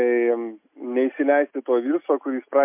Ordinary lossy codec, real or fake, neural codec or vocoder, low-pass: MP3, 32 kbps; real; none; 3.6 kHz